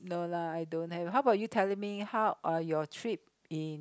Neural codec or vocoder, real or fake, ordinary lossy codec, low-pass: none; real; none; none